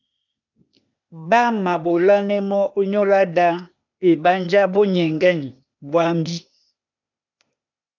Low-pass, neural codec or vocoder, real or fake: 7.2 kHz; codec, 16 kHz, 0.8 kbps, ZipCodec; fake